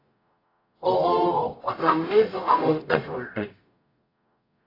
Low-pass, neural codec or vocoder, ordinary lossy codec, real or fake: 5.4 kHz; codec, 44.1 kHz, 0.9 kbps, DAC; AAC, 32 kbps; fake